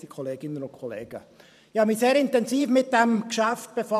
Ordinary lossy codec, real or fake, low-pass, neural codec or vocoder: none; fake; 14.4 kHz; vocoder, 44.1 kHz, 128 mel bands every 512 samples, BigVGAN v2